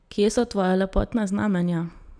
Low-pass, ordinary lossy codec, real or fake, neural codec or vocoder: 9.9 kHz; none; real; none